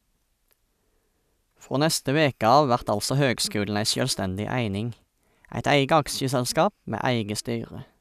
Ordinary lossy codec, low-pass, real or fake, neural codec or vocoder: none; 14.4 kHz; real; none